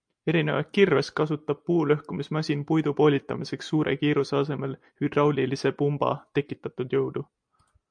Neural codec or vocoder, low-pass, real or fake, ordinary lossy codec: none; 9.9 kHz; real; MP3, 64 kbps